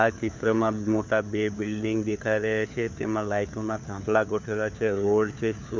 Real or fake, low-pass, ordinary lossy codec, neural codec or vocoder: fake; none; none; codec, 16 kHz, 4 kbps, FunCodec, trained on LibriTTS, 50 frames a second